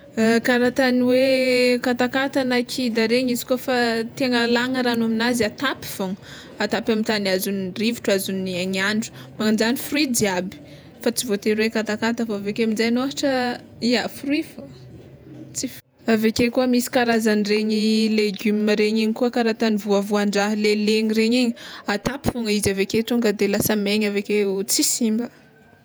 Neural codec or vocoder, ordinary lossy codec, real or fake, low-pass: vocoder, 48 kHz, 128 mel bands, Vocos; none; fake; none